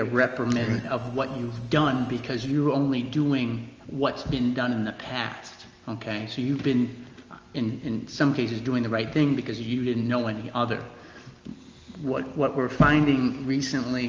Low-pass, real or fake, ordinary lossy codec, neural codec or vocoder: 7.2 kHz; real; Opus, 24 kbps; none